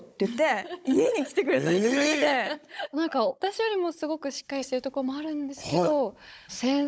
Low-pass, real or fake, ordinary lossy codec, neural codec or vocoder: none; fake; none; codec, 16 kHz, 16 kbps, FunCodec, trained on Chinese and English, 50 frames a second